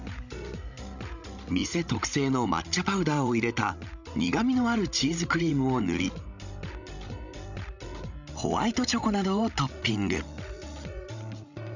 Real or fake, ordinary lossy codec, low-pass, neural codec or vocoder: fake; none; 7.2 kHz; codec, 16 kHz, 16 kbps, FreqCodec, larger model